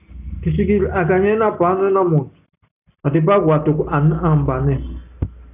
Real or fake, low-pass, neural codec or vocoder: real; 3.6 kHz; none